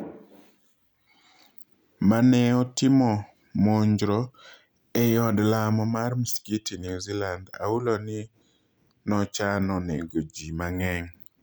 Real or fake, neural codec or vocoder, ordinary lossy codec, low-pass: real; none; none; none